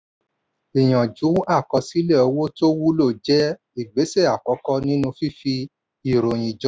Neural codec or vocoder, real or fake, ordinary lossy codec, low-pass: none; real; none; none